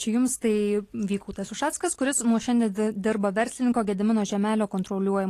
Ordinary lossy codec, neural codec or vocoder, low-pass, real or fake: AAC, 48 kbps; none; 14.4 kHz; real